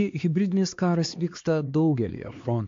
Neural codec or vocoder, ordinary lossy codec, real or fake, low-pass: codec, 16 kHz, 2 kbps, X-Codec, HuBERT features, trained on LibriSpeech; AAC, 48 kbps; fake; 7.2 kHz